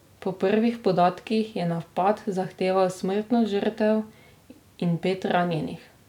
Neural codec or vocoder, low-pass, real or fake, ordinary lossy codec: none; 19.8 kHz; real; none